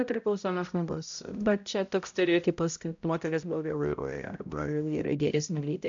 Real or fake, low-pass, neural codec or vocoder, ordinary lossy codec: fake; 7.2 kHz; codec, 16 kHz, 0.5 kbps, X-Codec, HuBERT features, trained on balanced general audio; AAC, 64 kbps